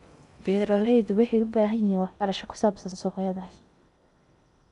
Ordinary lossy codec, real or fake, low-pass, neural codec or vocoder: MP3, 96 kbps; fake; 10.8 kHz; codec, 16 kHz in and 24 kHz out, 0.6 kbps, FocalCodec, streaming, 2048 codes